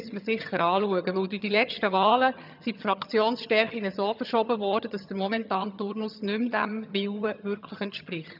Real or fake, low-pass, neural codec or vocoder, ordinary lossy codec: fake; 5.4 kHz; vocoder, 22.05 kHz, 80 mel bands, HiFi-GAN; none